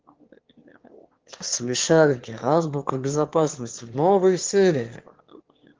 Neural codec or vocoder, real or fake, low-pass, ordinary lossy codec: autoencoder, 22.05 kHz, a latent of 192 numbers a frame, VITS, trained on one speaker; fake; 7.2 kHz; Opus, 16 kbps